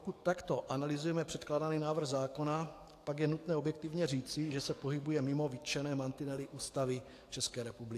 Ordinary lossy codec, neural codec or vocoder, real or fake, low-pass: AAC, 64 kbps; codec, 44.1 kHz, 7.8 kbps, DAC; fake; 14.4 kHz